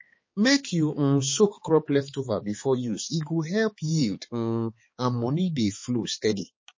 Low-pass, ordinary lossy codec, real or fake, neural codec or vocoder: 7.2 kHz; MP3, 32 kbps; fake; codec, 16 kHz, 4 kbps, X-Codec, HuBERT features, trained on balanced general audio